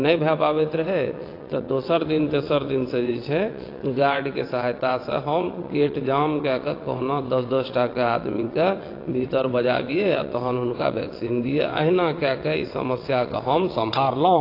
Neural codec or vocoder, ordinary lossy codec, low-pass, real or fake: vocoder, 22.05 kHz, 80 mel bands, WaveNeXt; AAC, 32 kbps; 5.4 kHz; fake